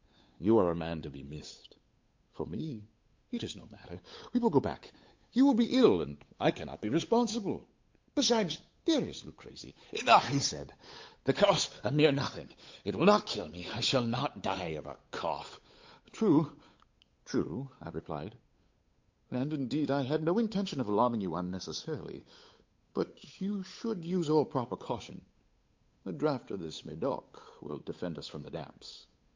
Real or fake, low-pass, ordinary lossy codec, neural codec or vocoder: fake; 7.2 kHz; MP3, 48 kbps; codec, 16 kHz, 8 kbps, FunCodec, trained on Chinese and English, 25 frames a second